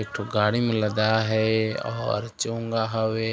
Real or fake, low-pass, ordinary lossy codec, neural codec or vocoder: real; none; none; none